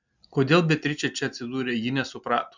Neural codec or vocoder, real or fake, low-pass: none; real; 7.2 kHz